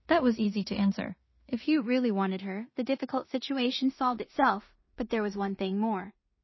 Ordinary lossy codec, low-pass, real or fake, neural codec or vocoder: MP3, 24 kbps; 7.2 kHz; fake; codec, 16 kHz in and 24 kHz out, 0.4 kbps, LongCat-Audio-Codec, two codebook decoder